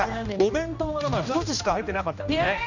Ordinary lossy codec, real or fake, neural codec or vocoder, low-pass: none; fake; codec, 16 kHz, 1 kbps, X-Codec, HuBERT features, trained on general audio; 7.2 kHz